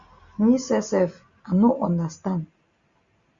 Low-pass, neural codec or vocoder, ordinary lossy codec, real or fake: 7.2 kHz; none; Opus, 64 kbps; real